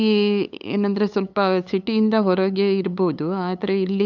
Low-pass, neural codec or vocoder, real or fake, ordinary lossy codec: 7.2 kHz; codec, 16 kHz, 8 kbps, FunCodec, trained on LibriTTS, 25 frames a second; fake; none